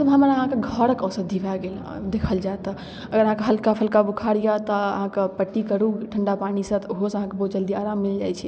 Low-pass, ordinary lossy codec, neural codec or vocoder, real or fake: none; none; none; real